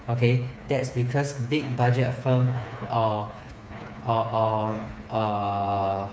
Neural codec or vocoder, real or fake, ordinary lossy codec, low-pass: codec, 16 kHz, 4 kbps, FreqCodec, smaller model; fake; none; none